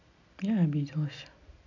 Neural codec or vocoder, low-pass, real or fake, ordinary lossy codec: none; 7.2 kHz; real; none